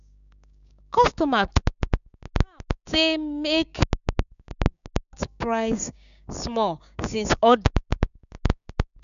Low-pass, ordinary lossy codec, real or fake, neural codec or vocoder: 7.2 kHz; none; fake; codec, 16 kHz, 6 kbps, DAC